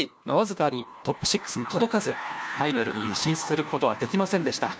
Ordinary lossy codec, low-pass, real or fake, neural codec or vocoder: none; none; fake; codec, 16 kHz, 1 kbps, FunCodec, trained on LibriTTS, 50 frames a second